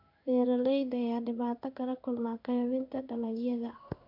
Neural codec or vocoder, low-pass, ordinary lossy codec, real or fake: codec, 16 kHz in and 24 kHz out, 1 kbps, XY-Tokenizer; 5.4 kHz; AAC, 48 kbps; fake